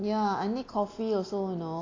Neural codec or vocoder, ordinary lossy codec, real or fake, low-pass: none; AAC, 32 kbps; real; 7.2 kHz